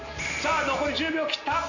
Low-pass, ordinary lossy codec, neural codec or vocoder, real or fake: 7.2 kHz; none; none; real